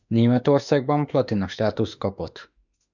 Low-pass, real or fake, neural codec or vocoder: 7.2 kHz; fake; autoencoder, 48 kHz, 32 numbers a frame, DAC-VAE, trained on Japanese speech